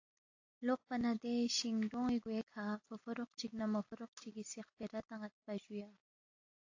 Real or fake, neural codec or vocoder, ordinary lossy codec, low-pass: real; none; AAC, 48 kbps; 7.2 kHz